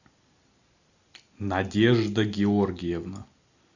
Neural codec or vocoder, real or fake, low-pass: none; real; 7.2 kHz